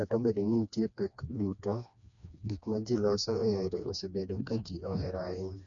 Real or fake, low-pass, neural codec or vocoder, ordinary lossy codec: fake; 7.2 kHz; codec, 16 kHz, 2 kbps, FreqCodec, smaller model; none